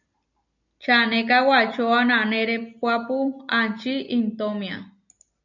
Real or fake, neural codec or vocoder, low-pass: real; none; 7.2 kHz